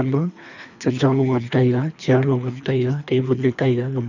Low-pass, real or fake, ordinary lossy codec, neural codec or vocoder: 7.2 kHz; fake; none; codec, 24 kHz, 3 kbps, HILCodec